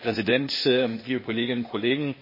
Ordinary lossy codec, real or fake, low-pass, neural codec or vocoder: MP3, 24 kbps; fake; 5.4 kHz; codec, 16 kHz, 0.8 kbps, ZipCodec